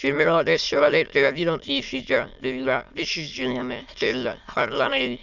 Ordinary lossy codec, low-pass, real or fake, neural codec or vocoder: none; 7.2 kHz; fake; autoencoder, 22.05 kHz, a latent of 192 numbers a frame, VITS, trained on many speakers